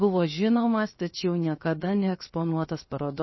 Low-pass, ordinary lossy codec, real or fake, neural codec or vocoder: 7.2 kHz; MP3, 24 kbps; fake; codec, 16 kHz, 0.7 kbps, FocalCodec